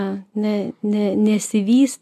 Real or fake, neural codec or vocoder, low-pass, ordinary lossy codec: real; none; 14.4 kHz; MP3, 96 kbps